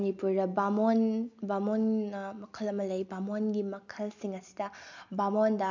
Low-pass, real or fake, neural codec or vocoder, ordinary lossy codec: 7.2 kHz; real; none; none